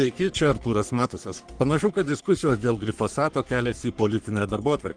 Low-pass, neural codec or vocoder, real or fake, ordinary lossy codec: 9.9 kHz; codec, 44.1 kHz, 3.4 kbps, Pupu-Codec; fake; Opus, 24 kbps